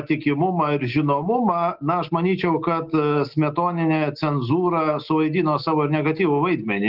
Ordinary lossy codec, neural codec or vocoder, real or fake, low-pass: Opus, 16 kbps; none; real; 5.4 kHz